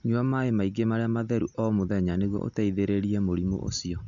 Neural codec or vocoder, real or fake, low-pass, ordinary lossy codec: none; real; 7.2 kHz; none